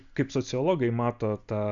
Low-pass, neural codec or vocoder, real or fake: 7.2 kHz; none; real